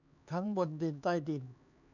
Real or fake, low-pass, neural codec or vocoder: fake; 7.2 kHz; codec, 16 kHz, 4 kbps, X-Codec, HuBERT features, trained on LibriSpeech